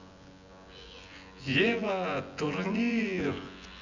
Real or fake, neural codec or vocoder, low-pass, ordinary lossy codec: fake; vocoder, 24 kHz, 100 mel bands, Vocos; 7.2 kHz; none